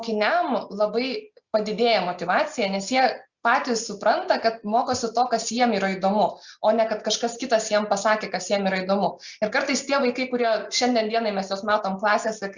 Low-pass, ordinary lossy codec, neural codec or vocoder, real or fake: 7.2 kHz; Opus, 64 kbps; none; real